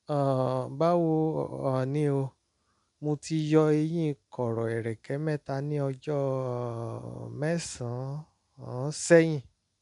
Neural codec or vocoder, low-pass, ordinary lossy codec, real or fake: none; 10.8 kHz; none; real